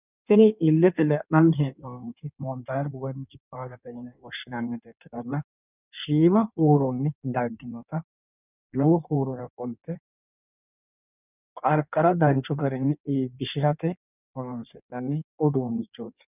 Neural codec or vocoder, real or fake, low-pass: codec, 16 kHz in and 24 kHz out, 1.1 kbps, FireRedTTS-2 codec; fake; 3.6 kHz